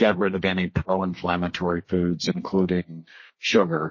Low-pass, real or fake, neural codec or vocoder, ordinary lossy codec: 7.2 kHz; fake; codec, 32 kHz, 1.9 kbps, SNAC; MP3, 32 kbps